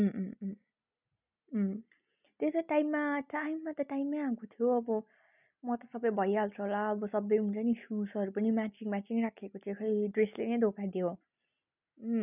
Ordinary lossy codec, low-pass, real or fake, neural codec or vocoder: none; 3.6 kHz; real; none